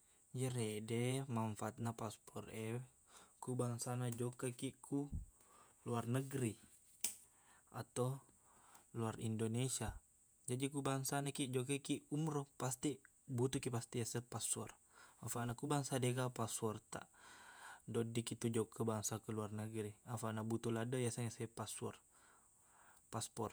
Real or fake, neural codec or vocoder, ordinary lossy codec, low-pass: fake; vocoder, 48 kHz, 128 mel bands, Vocos; none; none